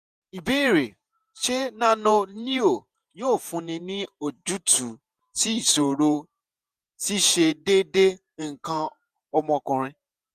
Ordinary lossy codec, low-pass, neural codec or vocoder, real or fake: none; 14.4 kHz; vocoder, 48 kHz, 128 mel bands, Vocos; fake